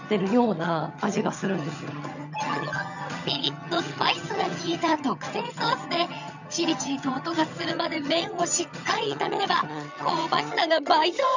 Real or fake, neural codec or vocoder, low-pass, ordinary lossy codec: fake; vocoder, 22.05 kHz, 80 mel bands, HiFi-GAN; 7.2 kHz; none